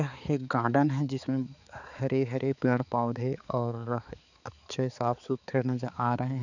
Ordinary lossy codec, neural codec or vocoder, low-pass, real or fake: none; codec, 16 kHz, 4 kbps, X-Codec, HuBERT features, trained on balanced general audio; 7.2 kHz; fake